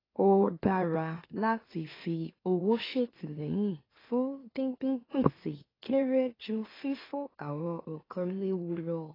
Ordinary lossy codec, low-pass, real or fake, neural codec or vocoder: AAC, 24 kbps; 5.4 kHz; fake; autoencoder, 44.1 kHz, a latent of 192 numbers a frame, MeloTTS